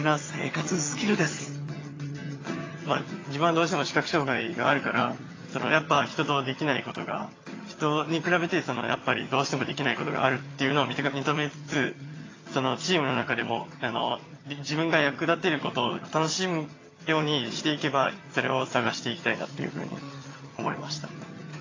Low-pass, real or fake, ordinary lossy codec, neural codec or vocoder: 7.2 kHz; fake; AAC, 32 kbps; vocoder, 22.05 kHz, 80 mel bands, HiFi-GAN